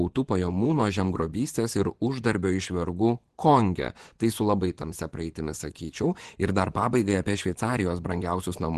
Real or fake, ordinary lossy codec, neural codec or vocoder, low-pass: fake; Opus, 16 kbps; vocoder, 24 kHz, 100 mel bands, Vocos; 10.8 kHz